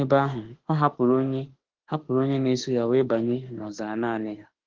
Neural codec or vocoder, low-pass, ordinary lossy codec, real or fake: autoencoder, 48 kHz, 32 numbers a frame, DAC-VAE, trained on Japanese speech; 7.2 kHz; Opus, 16 kbps; fake